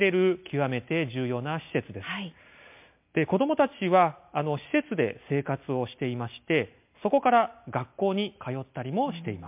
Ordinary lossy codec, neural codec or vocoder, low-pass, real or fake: MP3, 32 kbps; none; 3.6 kHz; real